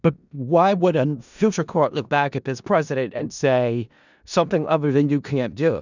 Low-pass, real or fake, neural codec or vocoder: 7.2 kHz; fake; codec, 16 kHz in and 24 kHz out, 0.4 kbps, LongCat-Audio-Codec, four codebook decoder